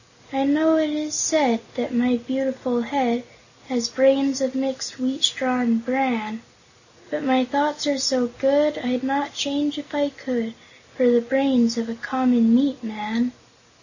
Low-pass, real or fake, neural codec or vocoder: 7.2 kHz; real; none